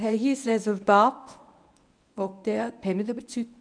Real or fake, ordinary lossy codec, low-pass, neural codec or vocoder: fake; none; 9.9 kHz; codec, 24 kHz, 0.9 kbps, WavTokenizer, medium speech release version 1